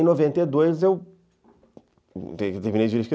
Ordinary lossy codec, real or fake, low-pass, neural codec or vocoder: none; real; none; none